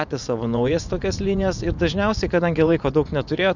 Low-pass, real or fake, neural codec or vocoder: 7.2 kHz; fake; vocoder, 24 kHz, 100 mel bands, Vocos